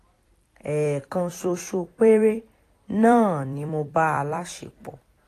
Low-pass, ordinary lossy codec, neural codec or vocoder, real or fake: 14.4 kHz; AAC, 48 kbps; vocoder, 44.1 kHz, 128 mel bands every 256 samples, BigVGAN v2; fake